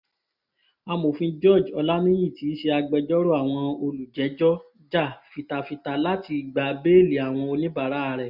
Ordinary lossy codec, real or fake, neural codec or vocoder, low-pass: none; real; none; 5.4 kHz